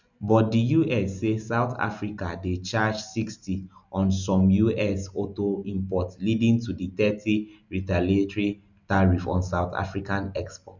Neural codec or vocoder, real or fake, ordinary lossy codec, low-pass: none; real; none; 7.2 kHz